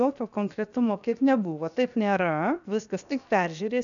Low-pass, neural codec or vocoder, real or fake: 7.2 kHz; codec, 16 kHz, 0.7 kbps, FocalCodec; fake